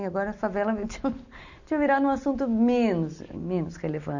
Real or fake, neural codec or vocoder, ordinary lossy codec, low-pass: real; none; none; 7.2 kHz